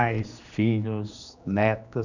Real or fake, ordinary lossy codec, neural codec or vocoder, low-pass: fake; none; codec, 16 kHz, 2 kbps, X-Codec, HuBERT features, trained on general audio; 7.2 kHz